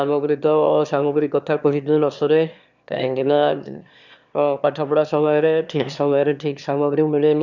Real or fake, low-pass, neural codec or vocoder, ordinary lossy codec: fake; 7.2 kHz; autoencoder, 22.05 kHz, a latent of 192 numbers a frame, VITS, trained on one speaker; none